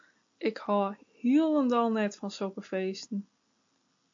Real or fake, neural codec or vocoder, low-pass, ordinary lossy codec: real; none; 7.2 kHz; AAC, 64 kbps